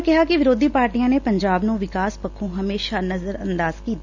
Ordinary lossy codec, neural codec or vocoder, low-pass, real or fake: Opus, 64 kbps; none; 7.2 kHz; real